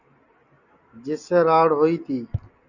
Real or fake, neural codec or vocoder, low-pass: real; none; 7.2 kHz